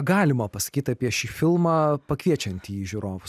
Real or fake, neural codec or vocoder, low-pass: real; none; 14.4 kHz